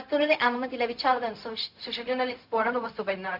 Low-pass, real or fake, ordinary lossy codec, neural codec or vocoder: 5.4 kHz; fake; none; codec, 16 kHz, 0.4 kbps, LongCat-Audio-Codec